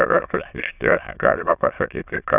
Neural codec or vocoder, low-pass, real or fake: autoencoder, 22.05 kHz, a latent of 192 numbers a frame, VITS, trained on many speakers; 3.6 kHz; fake